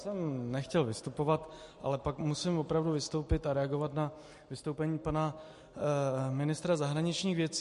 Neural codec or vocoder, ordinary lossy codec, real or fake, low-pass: none; MP3, 48 kbps; real; 14.4 kHz